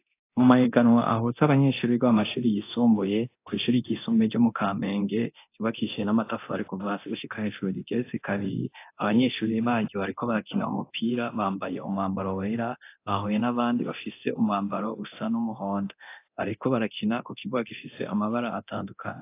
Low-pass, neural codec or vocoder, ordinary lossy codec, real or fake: 3.6 kHz; codec, 24 kHz, 0.9 kbps, DualCodec; AAC, 24 kbps; fake